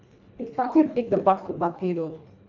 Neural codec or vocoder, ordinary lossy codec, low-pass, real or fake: codec, 24 kHz, 1.5 kbps, HILCodec; none; 7.2 kHz; fake